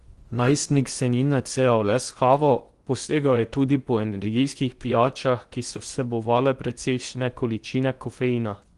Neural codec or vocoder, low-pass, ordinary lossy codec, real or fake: codec, 16 kHz in and 24 kHz out, 0.8 kbps, FocalCodec, streaming, 65536 codes; 10.8 kHz; Opus, 24 kbps; fake